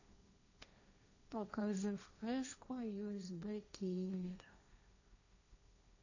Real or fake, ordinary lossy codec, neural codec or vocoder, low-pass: fake; none; codec, 16 kHz, 1.1 kbps, Voila-Tokenizer; 7.2 kHz